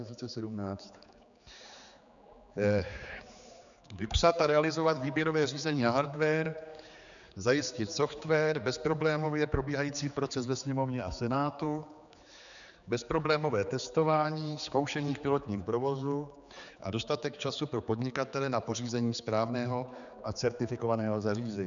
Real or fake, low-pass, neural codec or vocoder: fake; 7.2 kHz; codec, 16 kHz, 4 kbps, X-Codec, HuBERT features, trained on general audio